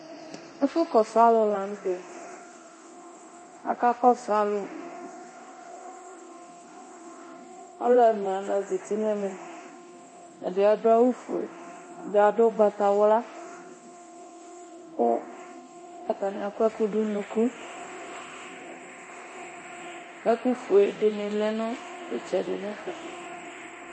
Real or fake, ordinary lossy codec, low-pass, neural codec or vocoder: fake; MP3, 32 kbps; 9.9 kHz; codec, 24 kHz, 0.9 kbps, DualCodec